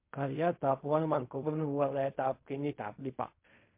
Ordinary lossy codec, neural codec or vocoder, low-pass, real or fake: MP3, 24 kbps; codec, 16 kHz in and 24 kHz out, 0.4 kbps, LongCat-Audio-Codec, fine tuned four codebook decoder; 3.6 kHz; fake